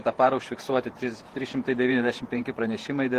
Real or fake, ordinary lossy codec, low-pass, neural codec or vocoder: fake; Opus, 16 kbps; 14.4 kHz; vocoder, 48 kHz, 128 mel bands, Vocos